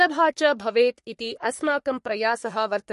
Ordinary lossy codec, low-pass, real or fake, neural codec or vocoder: MP3, 48 kbps; 14.4 kHz; fake; codec, 44.1 kHz, 3.4 kbps, Pupu-Codec